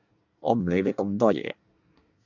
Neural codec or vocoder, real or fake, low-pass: codec, 24 kHz, 1 kbps, SNAC; fake; 7.2 kHz